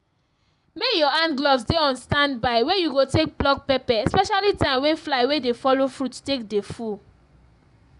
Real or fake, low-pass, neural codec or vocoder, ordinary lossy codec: fake; 10.8 kHz; vocoder, 24 kHz, 100 mel bands, Vocos; none